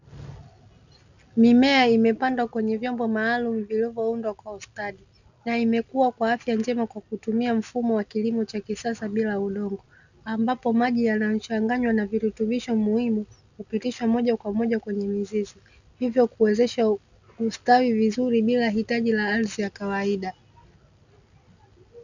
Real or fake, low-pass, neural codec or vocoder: real; 7.2 kHz; none